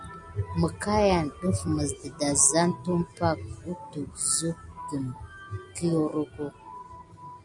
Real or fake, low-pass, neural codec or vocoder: real; 10.8 kHz; none